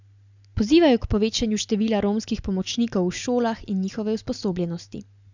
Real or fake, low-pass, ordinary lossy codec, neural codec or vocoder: real; 7.2 kHz; none; none